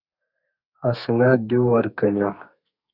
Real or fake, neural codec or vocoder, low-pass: fake; codec, 32 kHz, 1.9 kbps, SNAC; 5.4 kHz